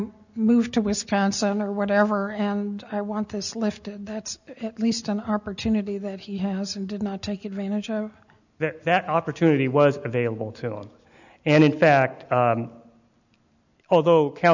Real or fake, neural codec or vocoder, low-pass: real; none; 7.2 kHz